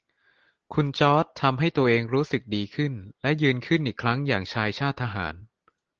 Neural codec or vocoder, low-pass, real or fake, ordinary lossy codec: none; 7.2 kHz; real; Opus, 16 kbps